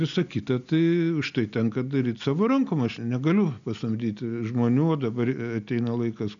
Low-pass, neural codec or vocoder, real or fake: 7.2 kHz; none; real